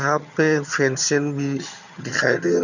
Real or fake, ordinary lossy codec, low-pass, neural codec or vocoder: fake; none; 7.2 kHz; vocoder, 22.05 kHz, 80 mel bands, HiFi-GAN